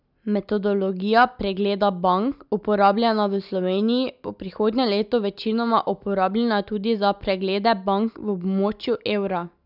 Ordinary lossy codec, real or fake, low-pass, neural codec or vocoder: none; real; 5.4 kHz; none